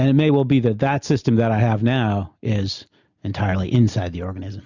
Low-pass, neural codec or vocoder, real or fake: 7.2 kHz; none; real